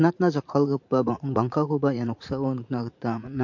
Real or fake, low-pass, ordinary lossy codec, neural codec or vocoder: fake; 7.2 kHz; MP3, 48 kbps; vocoder, 44.1 kHz, 80 mel bands, Vocos